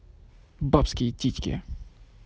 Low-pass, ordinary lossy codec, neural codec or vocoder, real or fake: none; none; none; real